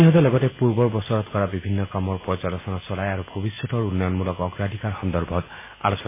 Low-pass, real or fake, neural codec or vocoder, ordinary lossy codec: 3.6 kHz; real; none; MP3, 16 kbps